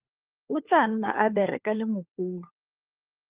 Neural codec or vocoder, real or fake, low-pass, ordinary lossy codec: codec, 16 kHz, 4 kbps, FunCodec, trained on LibriTTS, 50 frames a second; fake; 3.6 kHz; Opus, 24 kbps